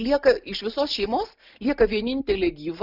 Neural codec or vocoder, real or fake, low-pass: none; real; 5.4 kHz